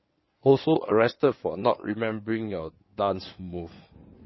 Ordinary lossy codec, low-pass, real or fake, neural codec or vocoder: MP3, 24 kbps; 7.2 kHz; fake; codec, 16 kHz in and 24 kHz out, 2.2 kbps, FireRedTTS-2 codec